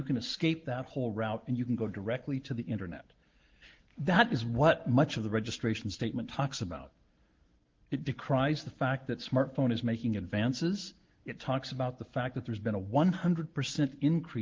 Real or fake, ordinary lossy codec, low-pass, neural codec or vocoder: real; Opus, 16 kbps; 7.2 kHz; none